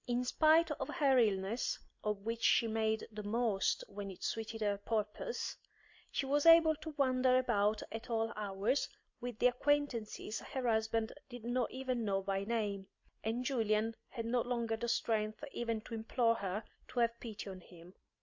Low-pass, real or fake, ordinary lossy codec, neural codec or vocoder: 7.2 kHz; real; MP3, 48 kbps; none